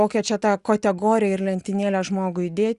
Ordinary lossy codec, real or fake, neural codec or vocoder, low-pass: Opus, 64 kbps; real; none; 10.8 kHz